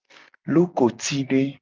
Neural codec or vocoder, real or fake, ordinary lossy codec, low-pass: none; real; Opus, 32 kbps; 7.2 kHz